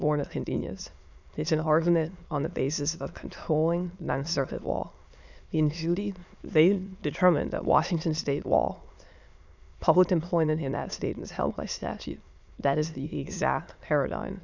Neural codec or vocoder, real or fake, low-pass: autoencoder, 22.05 kHz, a latent of 192 numbers a frame, VITS, trained on many speakers; fake; 7.2 kHz